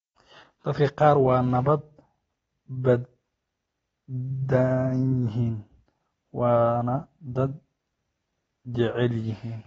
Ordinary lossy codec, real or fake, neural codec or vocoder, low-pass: AAC, 24 kbps; real; none; 19.8 kHz